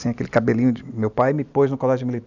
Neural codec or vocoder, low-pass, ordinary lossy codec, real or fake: none; 7.2 kHz; none; real